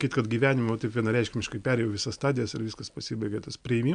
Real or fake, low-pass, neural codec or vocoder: real; 9.9 kHz; none